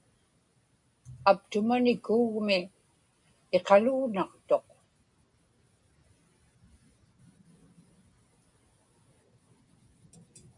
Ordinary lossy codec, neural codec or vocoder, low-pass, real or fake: AAC, 64 kbps; none; 10.8 kHz; real